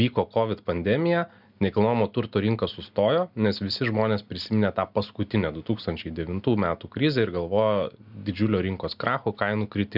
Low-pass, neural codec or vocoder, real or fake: 5.4 kHz; none; real